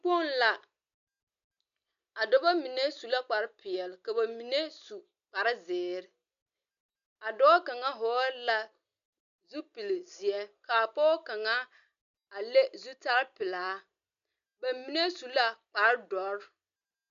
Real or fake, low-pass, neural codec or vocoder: real; 7.2 kHz; none